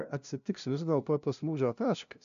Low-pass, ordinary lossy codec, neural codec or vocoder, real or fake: 7.2 kHz; MP3, 64 kbps; codec, 16 kHz, 0.5 kbps, FunCodec, trained on LibriTTS, 25 frames a second; fake